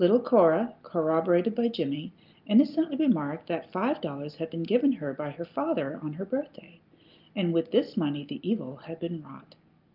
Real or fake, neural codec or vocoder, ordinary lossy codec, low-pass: real; none; Opus, 24 kbps; 5.4 kHz